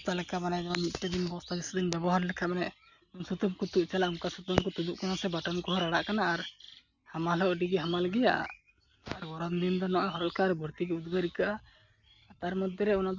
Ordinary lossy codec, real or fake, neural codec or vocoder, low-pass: none; real; none; 7.2 kHz